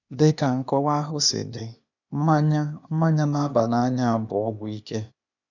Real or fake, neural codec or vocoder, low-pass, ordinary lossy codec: fake; codec, 16 kHz, 0.8 kbps, ZipCodec; 7.2 kHz; none